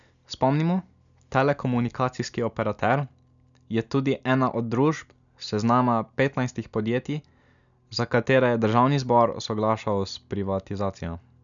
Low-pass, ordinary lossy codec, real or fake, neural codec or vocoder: 7.2 kHz; none; real; none